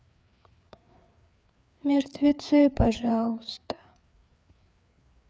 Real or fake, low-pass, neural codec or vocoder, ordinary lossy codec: fake; none; codec, 16 kHz, 4 kbps, FreqCodec, larger model; none